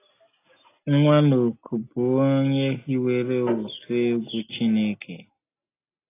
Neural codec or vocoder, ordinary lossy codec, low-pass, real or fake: none; AAC, 24 kbps; 3.6 kHz; real